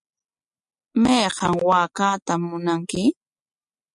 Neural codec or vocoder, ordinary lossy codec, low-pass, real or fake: none; MP3, 64 kbps; 10.8 kHz; real